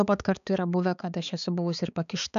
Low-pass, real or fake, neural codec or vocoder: 7.2 kHz; fake; codec, 16 kHz, 4 kbps, X-Codec, HuBERT features, trained on balanced general audio